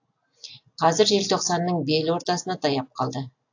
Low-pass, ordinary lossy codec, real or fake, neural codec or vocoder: 7.2 kHz; AAC, 48 kbps; real; none